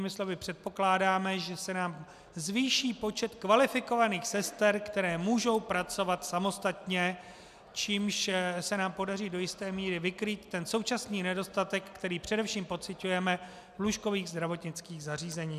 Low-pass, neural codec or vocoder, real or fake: 14.4 kHz; none; real